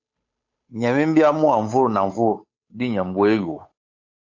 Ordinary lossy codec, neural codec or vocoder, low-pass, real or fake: AAC, 48 kbps; codec, 16 kHz, 8 kbps, FunCodec, trained on Chinese and English, 25 frames a second; 7.2 kHz; fake